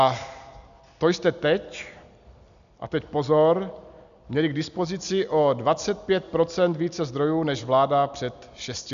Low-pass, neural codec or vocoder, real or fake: 7.2 kHz; none; real